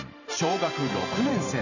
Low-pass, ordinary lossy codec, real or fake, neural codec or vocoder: 7.2 kHz; none; fake; vocoder, 44.1 kHz, 128 mel bands every 512 samples, BigVGAN v2